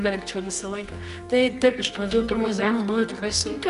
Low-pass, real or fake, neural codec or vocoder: 10.8 kHz; fake; codec, 24 kHz, 0.9 kbps, WavTokenizer, medium music audio release